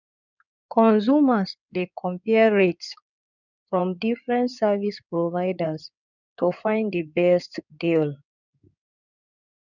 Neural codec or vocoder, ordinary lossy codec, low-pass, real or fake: codec, 16 kHz in and 24 kHz out, 2.2 kbps, FireRedTTS-2 codec; none; 7.2 kHz; fake